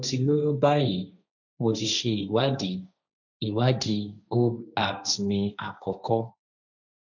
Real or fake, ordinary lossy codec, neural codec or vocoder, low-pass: fake; none; codec, 16 kHz, 1.1 kbps, Voila-Tokenizer; 7.2 kHz